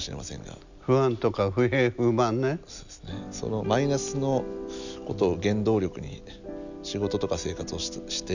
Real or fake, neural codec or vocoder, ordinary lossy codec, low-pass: real; none; none; 7.2 kHz